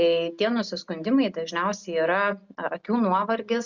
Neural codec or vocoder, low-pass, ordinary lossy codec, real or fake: none; 7.2 kHz; Opus, 64 kbps; real